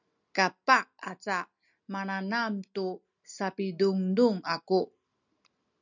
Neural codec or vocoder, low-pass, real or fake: none; 7.2 kHz; real